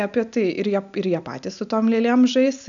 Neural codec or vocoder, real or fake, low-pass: none; real; 7.2 kHz